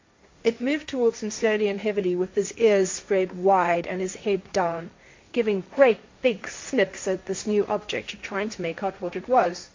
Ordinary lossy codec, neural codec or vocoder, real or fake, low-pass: MP3, 48 kbps; codec, 16 kHz, 1.1 kbps, Voila-Tokenizer; fake; 7.2 kHz